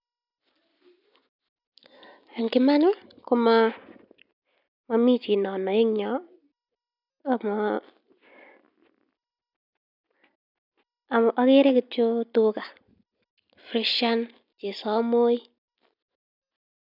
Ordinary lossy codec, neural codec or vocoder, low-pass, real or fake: none; none; 5.4 kHz; real